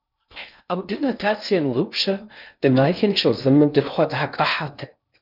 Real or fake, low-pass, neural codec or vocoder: fake; 5.4 kHz; codec, 16 kHz in and 24 kHz out, 0.6 kbps, FocalCodec, streaming, 2048 codes